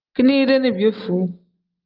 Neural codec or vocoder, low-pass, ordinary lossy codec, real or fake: none; 5.4 kHz; Opus, 32 kbps; real